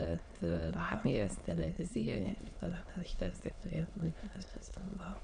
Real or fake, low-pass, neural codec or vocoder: fake; 9.9 kHz; autoencoder, 22.05 kHz, a latent of 192 numbers a frame, VITS, trained on many speakers